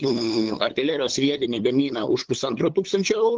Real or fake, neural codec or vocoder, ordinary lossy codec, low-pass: fake; codec, 16 kHz, 8 kbps, FunCodec, trained on LibriTTS, 25 frames a second; Opus, 16 kbps; 7.2 kHz